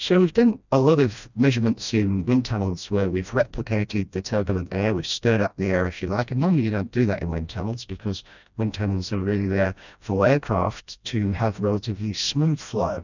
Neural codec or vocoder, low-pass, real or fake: codec, 16 kHz, 1 kbps, FreqCodec, smaller model; 7.2 kHz; fake